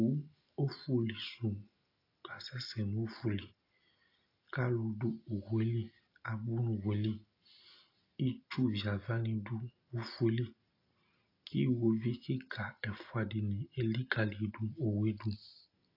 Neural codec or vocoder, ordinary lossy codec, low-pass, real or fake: none; AAC, 32 kbps; 5.4 kHz; real